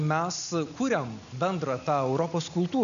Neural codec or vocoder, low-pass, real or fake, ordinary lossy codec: none; 7.2 kHz; real; AAC, 96 kbps